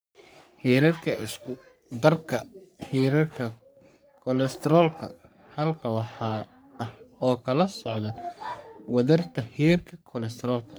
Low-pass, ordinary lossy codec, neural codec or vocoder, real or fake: none; none; codec, 44.1 kHz, 3.4 kbps, Pupu-Codec; fake